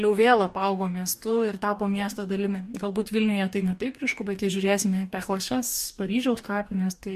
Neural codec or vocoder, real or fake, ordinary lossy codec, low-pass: codec, 44.1 kHz, 2.6 kbps, DAC; fake; MP3, 64 kbps; 14.4 kHz